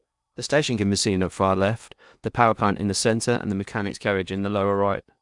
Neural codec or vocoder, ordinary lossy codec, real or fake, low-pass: codec, 16 kHz in and 24 kHz out, 0.8 kbps, FocalCodec, streaming, 65536 codes; none; fake; 10.8 kHz